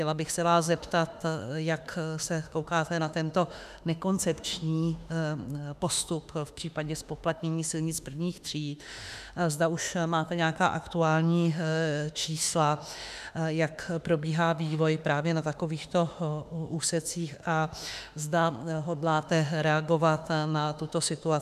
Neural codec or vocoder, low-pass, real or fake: autoencoder, 48 kHz, 32 numbers a frame, DAC-VAE, trained on Japanese speech; 14.4 kHz; fake